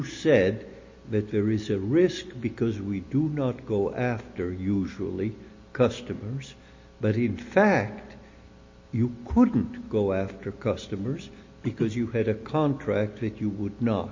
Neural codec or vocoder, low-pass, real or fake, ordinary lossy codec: none; 7.2 kHz; real; MP3, 32 kbps